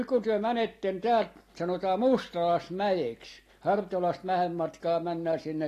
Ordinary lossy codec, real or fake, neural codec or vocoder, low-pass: AAC, 48 kbps; real; none; 14.4 kHz